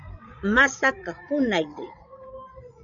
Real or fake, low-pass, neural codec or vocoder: fake; 7.2 kHz; codec, 16 kHz, 16 kbps, FreqCodec, larger model